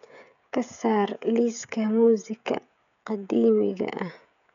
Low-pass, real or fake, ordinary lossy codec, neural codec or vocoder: 7.2 kHz; fake; none; codec, 16 kHz, 16 kbps, FreqCodec, smaller model